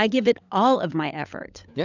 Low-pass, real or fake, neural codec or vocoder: 7.2 kHz; fake; codec, 16 kHz, 16 kbps, FunCodec, trained on LibriTTS, 50 frames a second